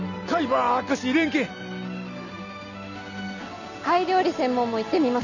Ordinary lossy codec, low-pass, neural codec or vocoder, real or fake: none; 7.2 kHz; none; real